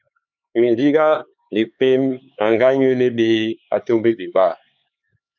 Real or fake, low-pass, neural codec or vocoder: fake; 7.2 kHz; codec, 16 kHz, 4 kbps, X-Codec, HuBERT features, trained on LibriSpeech